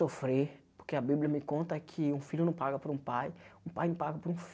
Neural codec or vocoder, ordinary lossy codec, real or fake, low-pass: none; none; real; none